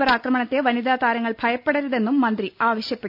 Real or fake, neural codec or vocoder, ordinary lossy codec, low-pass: real; none; none; 5.4 kHz